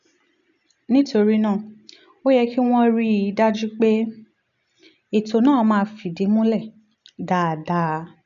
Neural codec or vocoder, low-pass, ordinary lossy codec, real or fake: none; 7.2 kHz; none; real